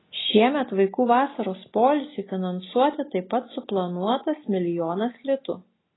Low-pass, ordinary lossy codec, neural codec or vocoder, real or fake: 7.2 kHz; AAC, 16 kbps; none; real